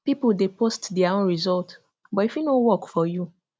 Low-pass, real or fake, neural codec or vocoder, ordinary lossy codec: none; real; none; none